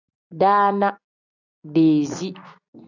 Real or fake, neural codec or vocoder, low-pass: real; none; 7.2 kHz